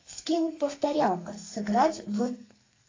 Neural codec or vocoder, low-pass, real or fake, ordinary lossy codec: codec, 32 kHz, 1.9 kbps, SNAC; 7.2 kHz; fake; AAC, 32 kbps